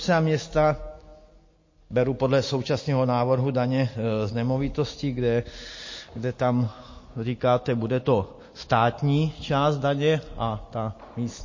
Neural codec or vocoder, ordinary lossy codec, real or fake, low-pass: none; MP3, 32 kbps; real; 7.2 kHz